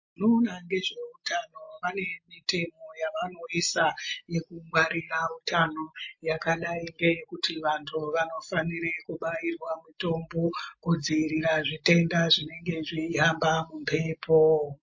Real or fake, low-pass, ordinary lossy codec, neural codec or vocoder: real; 7.2 kHz; MP3, 32 kbps; none